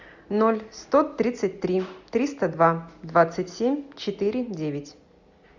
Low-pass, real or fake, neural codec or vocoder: 7.2 kHz; real; none